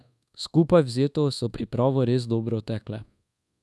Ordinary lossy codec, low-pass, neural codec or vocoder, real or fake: none; none; codec, 24 kHz, 1.2 kbps, DualCodec; fake